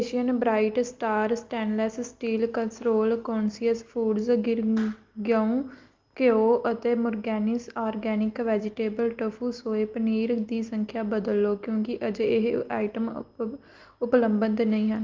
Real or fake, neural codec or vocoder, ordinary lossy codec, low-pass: real; none; Opus, 32 kbps; 7.2 kHz